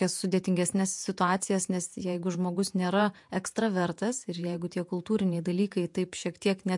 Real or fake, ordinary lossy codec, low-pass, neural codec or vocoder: fake; MP3, 64 kbps; 10.8 kHz; vocoder, 48 kHz, 128 mel bands, Vocos